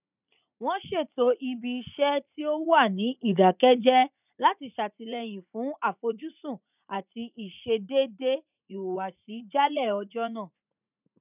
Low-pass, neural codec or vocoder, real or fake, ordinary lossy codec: 3.6 kHz; vocoder, 44.1 kHz, 80 mel bands, Vocos; fake; none